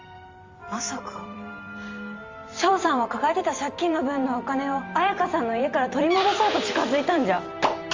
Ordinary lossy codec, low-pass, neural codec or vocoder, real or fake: Opus, 32 kbps; 7.2 kHz; vocoder, 44.1 kHz, 128 mel bands every 512 samples, BigVGAN v2; fake